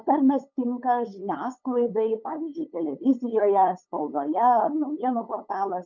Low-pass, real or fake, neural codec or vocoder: 7.2 kHz; fake; codec, 16 kHz, 8 kbps, FunCodec, trained on LibriTTS, 25 frames a second